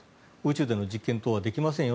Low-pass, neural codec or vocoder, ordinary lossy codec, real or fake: none; none; none; real